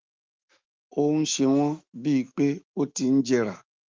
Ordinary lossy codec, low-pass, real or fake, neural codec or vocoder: Opus, 32 kbps; 7.2 kHz; fake; autoencoder, 48 kHz, 128 numbers a frame, DAC-VAE, trained on Japanese speech